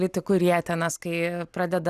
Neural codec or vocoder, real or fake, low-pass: none; real; 14.4 kHz